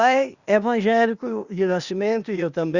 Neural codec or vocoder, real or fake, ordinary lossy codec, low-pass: codec, 16 kHz, 0.8 kbps, ZipCodec; fake; Opus, 64 kbps; 7.2 kHz